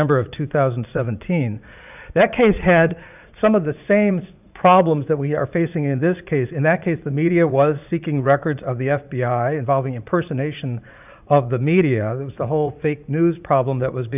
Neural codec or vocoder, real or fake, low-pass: vocoder, 44.1 kHz, 80 mel bands, Vocos; fake; 3.6 kHz